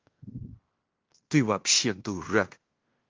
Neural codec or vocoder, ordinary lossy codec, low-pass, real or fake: codec, 16 kHz in and 24 kHz out, 0.9 kbps, LongCat-Audio-Codec, fine tuned four codebook decoder; Opus, 24 kbps; 7.2 kHz; fake